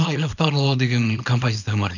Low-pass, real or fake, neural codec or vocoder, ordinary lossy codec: 7.2 kHz; fake; codec, 24 kHz, 0.9 kbps, WavTokenizer, small release; none